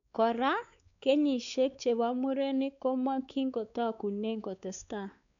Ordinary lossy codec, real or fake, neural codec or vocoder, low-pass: none; fake; codec, 16 kHz, 4 kbps, X-Codec, WavLM features, trained on Multilingual LibriSpeech; 7.2 kHz